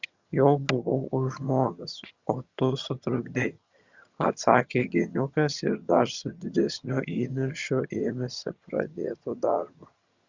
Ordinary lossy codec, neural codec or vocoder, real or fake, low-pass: Opus, 64 kbps; vocoder, 22.05 kHz, 80 mel bands, HiFi-GAN; fake; 7.2 kHz